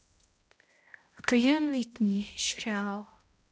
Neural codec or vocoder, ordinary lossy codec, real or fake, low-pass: codec, 16 kHz, 0.5 kbps, X-Codec, HuBERT features, trained on balanced general audio; none; fake; none